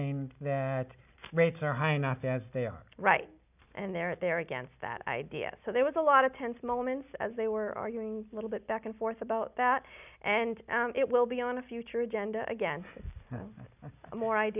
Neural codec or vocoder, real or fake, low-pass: none; real; 3.6 kHz